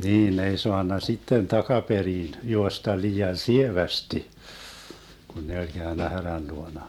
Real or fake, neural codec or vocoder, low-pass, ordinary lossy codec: real; none; 19.8 kHz; MP3, 96 kbps